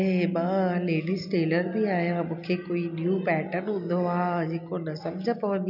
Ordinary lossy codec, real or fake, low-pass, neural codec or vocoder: none; real; 5.4 kHz; none